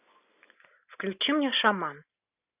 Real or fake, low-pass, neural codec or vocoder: real; 3.6 kHz; none